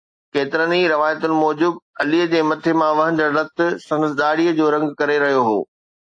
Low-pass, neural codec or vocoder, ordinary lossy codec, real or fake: 9.9 kHz; none; AAC, 48 kbps; real